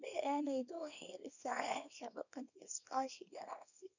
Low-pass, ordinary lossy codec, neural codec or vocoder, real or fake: 7.2 kHz; none; codec, 24 kHz, 0.9 kbps, WavTokenizer, small release; fake